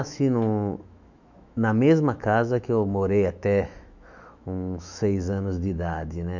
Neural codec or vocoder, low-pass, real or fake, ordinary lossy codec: autoencoder, 48 kHz, 128 numbers a frame, DAC-VAE, trained on Japanese speech; 7.2 kHz; fake; none